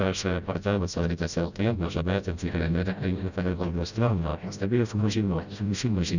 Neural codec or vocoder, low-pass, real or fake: codec, 16 kHz, 0.5 kbps, FreqCodec, smaller model; 7.2 kHz; fake